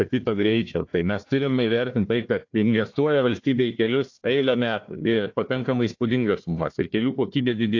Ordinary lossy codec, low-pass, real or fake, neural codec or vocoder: AAC, 48 kbps; 7.2 kHz; fake; codec, 16 kHz, 1 kbps, FunCodec, trained on Chinese and English, 50 frames a second